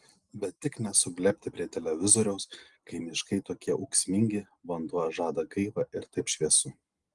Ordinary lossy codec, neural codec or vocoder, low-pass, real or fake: Opus, 24 kbps; none; 10.8 kHz; real